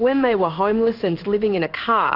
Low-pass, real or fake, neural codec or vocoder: 5.4 kHz; fake; codec, 16 kHz in and 24 kHz out, 1 kbps, XY-Tokenizer